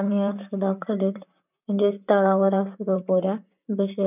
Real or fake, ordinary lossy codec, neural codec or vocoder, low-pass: fake; none; vocoder, 44.1 kHz, 128 mel bands, Pupu-Vocoder; 3.6 kHz